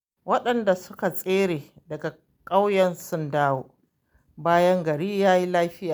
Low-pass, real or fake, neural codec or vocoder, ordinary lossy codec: none; real; none; none